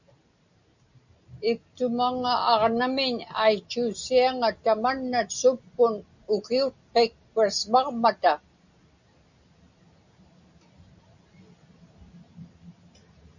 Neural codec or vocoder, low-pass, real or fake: none; 7.2 kHz; real